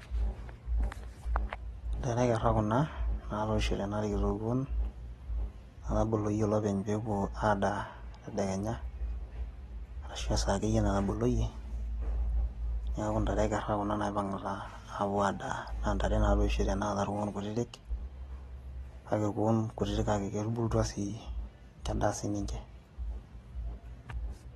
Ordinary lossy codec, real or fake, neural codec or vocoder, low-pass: AAC, 32 kbps; real; none; 19.8 kHz